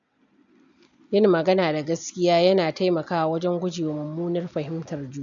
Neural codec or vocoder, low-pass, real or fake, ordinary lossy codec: none; 7.2 kHz; real; none